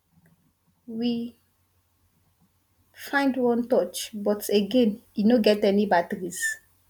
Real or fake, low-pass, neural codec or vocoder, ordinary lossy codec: real; 19.8 kHz; none; none